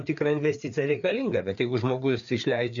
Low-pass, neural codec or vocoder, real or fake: 7.2 kHz; codec, 16 kHz, 4 kbps, FreqCodec, larger model; fake